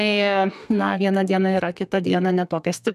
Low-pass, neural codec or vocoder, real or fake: 14.4 kHz; codec, 32 kHz, 1.9 kbps, SNAC; fake